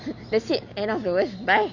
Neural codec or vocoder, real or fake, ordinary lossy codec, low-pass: none; real; none; 7.2 kHz